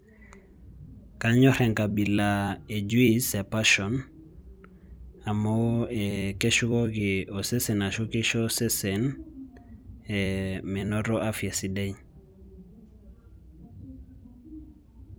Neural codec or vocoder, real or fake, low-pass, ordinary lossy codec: vocoder, 44.1 kHz, 128 mel bands every 256 samples, BigVGAN v2; fake; none; none